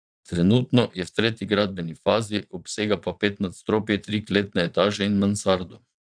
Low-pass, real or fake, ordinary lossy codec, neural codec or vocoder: 9.9 kHz; fake; none; vocoder, 22.05 kHz, 80 mel bands, Vocos